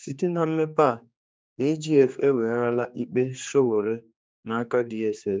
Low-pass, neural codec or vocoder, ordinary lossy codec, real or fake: none; codec, 16 kHz, 2 kbps, X-Codec, HuBERT features, trained on general audio; none; fake